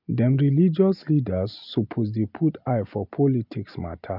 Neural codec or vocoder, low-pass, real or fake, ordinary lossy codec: none; 5.4 kHz; real; none